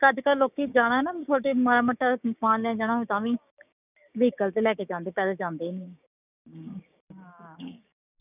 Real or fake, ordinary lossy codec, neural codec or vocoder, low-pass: fake; none; codec, 16 kHz, 6 kbps, DAC; 3.6 kHz